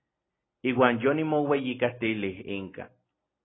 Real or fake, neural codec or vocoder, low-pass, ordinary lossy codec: real; none; 7.2 kHz; AAC, 16 kbps